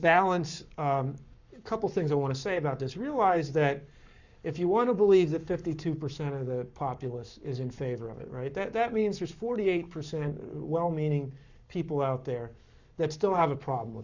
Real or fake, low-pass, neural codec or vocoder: fake; 7.2 kHz; codec, 44.1 kHz, 7.8 kbps, Pupu-Codec